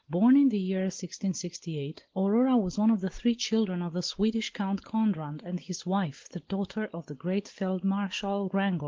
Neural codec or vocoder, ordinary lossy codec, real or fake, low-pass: none; Opus, 16 kbps; real; 7.2 kHz